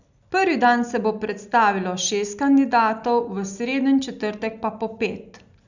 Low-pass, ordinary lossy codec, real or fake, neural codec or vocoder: 7.2 kHz; none; real; none